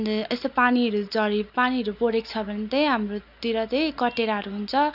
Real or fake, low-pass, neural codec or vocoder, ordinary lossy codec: real; 5.4 kHz; none; none